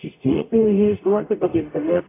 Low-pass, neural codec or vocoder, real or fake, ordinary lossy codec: 3.6 kHz; codec, 44.1 kHz, 0.9 kbps, DAC; fake; AAC, 24 kbps